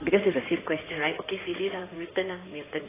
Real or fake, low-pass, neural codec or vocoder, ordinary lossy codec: fake; 3.6 kHz; codec, 16 kHz in and 24 kHz out, 2.2 kbps, FireRedTTS-2 codec; AAC, 16 kbps